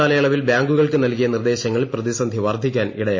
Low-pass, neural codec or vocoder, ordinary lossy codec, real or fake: 7.2 kHz; none; MP3, 32 kbps; real